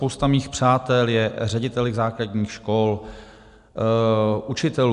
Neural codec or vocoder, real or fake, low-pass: none; real; 10.8 kHz